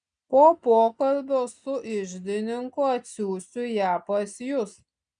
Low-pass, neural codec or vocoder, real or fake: 9.9 kHz; none; real